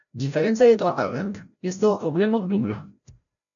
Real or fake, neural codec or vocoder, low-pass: fake; codec, 16 kHz, 0.5 kbps, FreqCodec, larger model; 7.2 kHz